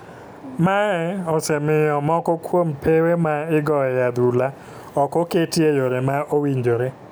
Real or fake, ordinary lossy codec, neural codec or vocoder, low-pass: real; none; none; none